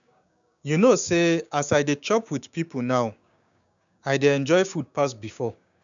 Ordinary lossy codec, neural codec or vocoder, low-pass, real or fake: none; codec, 16 kHz, 6 kbps, DAC; 7.2 kHz; fake